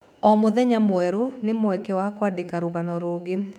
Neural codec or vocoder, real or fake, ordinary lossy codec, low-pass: autoencoder, 48 kHz, 32 numbers a frame, DAC-VAE, trained on Japanese speech; fake; MP3, 96 kbps; 19.8 kHz